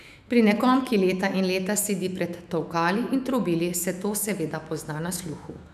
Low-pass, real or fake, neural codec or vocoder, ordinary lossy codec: 14.4 kHz; fake; autoencoder, 48 kHz, 128 numbers a frame, DAC-VAE, trained on Japanese speech; none